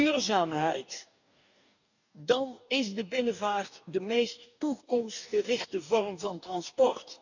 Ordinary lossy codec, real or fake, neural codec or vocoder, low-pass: none; fake; codec, 44.1 kHz, 2.6 kbps, DAC; 7.2 kHz